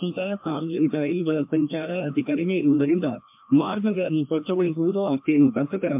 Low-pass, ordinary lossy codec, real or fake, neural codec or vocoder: 3.6 kHz; MP3, 32 kbps; fake; codec, 16 kHz, 1 kbps, FreqCodec, larger model